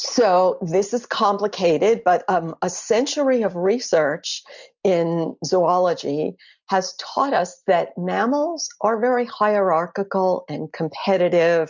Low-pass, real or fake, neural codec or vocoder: 7.2 kHz; real; none